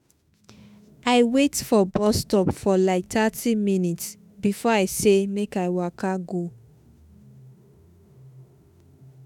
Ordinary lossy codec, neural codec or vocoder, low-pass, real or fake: none; autoencoder, 48 kHz, 32 numbers a frame, DAC-VAE, trained on Japanese speech; 19.8 kHz; fake